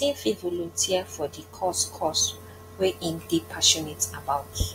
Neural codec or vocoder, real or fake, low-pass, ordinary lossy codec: none; real; 19.8 kHz; AAC, 48 kbps